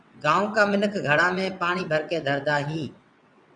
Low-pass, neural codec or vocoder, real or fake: 9.9 kHz; vocoder, 22.05 kHz, 80 mel bands, WaveNeXt; fake